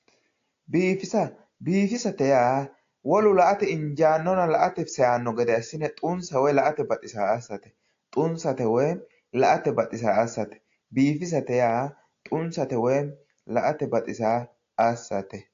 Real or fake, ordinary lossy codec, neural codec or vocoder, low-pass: real; AAC, 48 kbps; none; 7.2 kHz